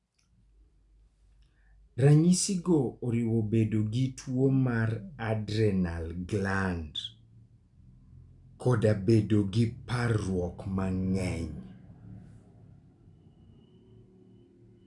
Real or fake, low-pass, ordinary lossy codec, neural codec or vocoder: real; 10.8 kHz; none; none